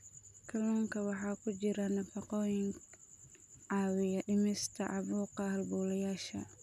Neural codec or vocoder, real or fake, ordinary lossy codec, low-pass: none; real; none; 14.4 kHz